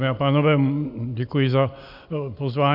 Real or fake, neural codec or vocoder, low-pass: real; none; 5.4 kHz